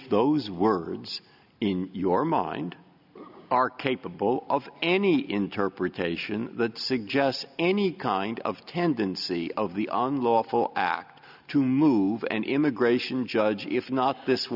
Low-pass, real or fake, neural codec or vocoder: 5.4 kHz; real; none